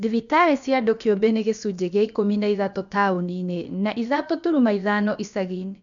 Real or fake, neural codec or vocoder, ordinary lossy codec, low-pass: fake; codec, 16 kHz, about 1 kbps, DyCAST, with the encoder's durations; none; 7.2 kHz